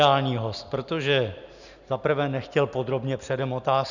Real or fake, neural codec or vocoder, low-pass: real; none; 7.2 kHz